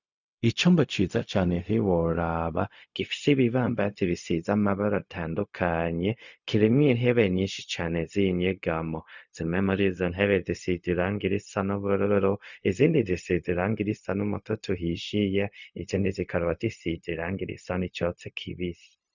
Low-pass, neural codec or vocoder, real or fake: 7.2 kHz; codec, 16 kHz, 0.4 kbps, LongCat-Audio-Codec; fake